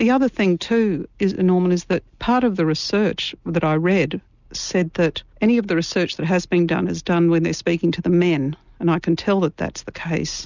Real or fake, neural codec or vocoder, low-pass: real; none; 7.2 kHz